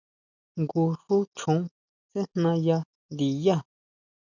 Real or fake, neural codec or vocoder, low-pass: real; none; 7.2 kHz